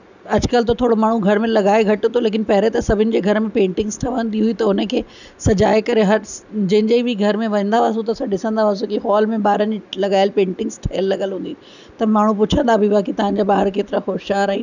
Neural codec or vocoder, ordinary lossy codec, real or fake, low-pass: none; none; real; 7.2 kHz